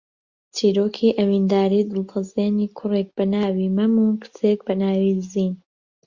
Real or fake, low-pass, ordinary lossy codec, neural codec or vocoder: real; 7.2 kHz; Opus, 64 kbps; none